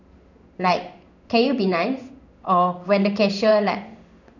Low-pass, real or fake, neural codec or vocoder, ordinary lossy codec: 7.2 kHz; fake; codec, 16 kHz in and 24 kHz out, 1 kbps, XY-Tokenizer; none